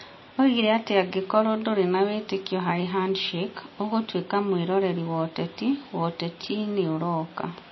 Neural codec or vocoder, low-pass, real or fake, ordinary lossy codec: none; 7.2 kHz; real; MP3, 24 kbps